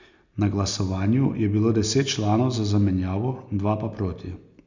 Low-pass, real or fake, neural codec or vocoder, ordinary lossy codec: 7.2 kHz; real; none; Opus, 64 kbps